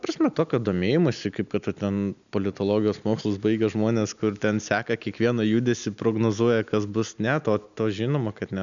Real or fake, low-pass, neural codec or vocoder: real; 7.2 kHz; none